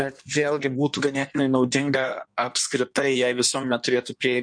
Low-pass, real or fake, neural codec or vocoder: 9.9 kHz; fake; codec, 16 kHz in and 24 kHz out, 1.1 kbps, FireRedTTS-2 codec